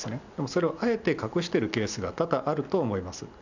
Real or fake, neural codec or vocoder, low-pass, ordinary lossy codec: real; none; 7.2 kHz; none